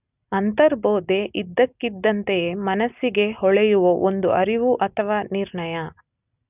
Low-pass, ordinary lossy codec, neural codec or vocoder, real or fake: 3.6 kHz; none; none; real